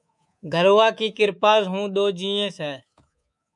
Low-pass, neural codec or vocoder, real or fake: 10.8 kHz; codec, 24 kHz, 3.1 kbps, DualCodec; fake